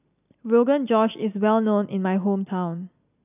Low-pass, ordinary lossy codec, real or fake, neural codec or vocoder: 3.6 kHz; none; real; none